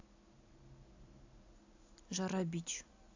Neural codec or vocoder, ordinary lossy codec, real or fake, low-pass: none; AAC, 48 kbps; real; 7.2 kHz